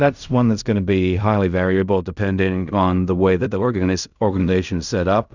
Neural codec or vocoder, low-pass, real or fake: codec, 16 kHz in and 24 kHz out, 0.4 kbps, LongCat-Audio-Codec, fine tuned four codebook decoder; 7.2 kHz; fake